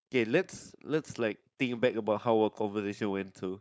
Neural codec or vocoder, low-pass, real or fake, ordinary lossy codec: codec, 16 kHz, 4.8 kbps, FACodec; none; fake; none